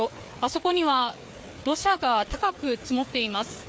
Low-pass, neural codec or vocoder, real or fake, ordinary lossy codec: none; codec, 16 kHz, 4 kbps, FreqCodec, larger model; fake; none